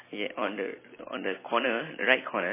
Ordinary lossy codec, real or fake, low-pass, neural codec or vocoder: MP3, 16 kbps; fake; 3.6 kHz; vocoder, 44.1 kHz, 128 mel bands every 256 samples, BigVGAN v2